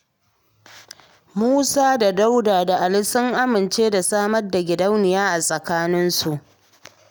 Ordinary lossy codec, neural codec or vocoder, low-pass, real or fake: none; none; none; real